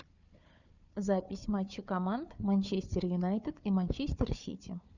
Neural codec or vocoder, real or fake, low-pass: codec, 16 kHz, 4 kbps, FunCodec, trained on Chinese and English, 50 frames a second; fake; 7.2 kHz